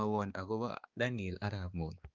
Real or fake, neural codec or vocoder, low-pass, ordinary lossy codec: fake; codec, 16 kHz, 2 kbps, X-Codec, HuBERT features, trained on balanced general audio; 7.2 kHz; Opus, 24 kbps